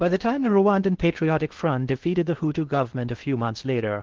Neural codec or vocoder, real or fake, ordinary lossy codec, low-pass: codec, 16 kHz in and 24 kHz out, 0.8 kbps, FocalCodec, streaming, 65536 codes; fake; Opus, 16 kbps; 7.2 kHz